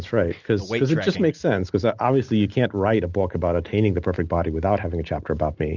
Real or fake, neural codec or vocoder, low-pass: real; none; 7.2 kHz